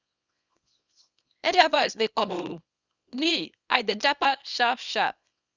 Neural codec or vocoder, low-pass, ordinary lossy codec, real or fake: codec, 24 kHz, 0.9 kbps, WavTokenizer, small release; 7.2 kHz; Opus, 64 kbps; fake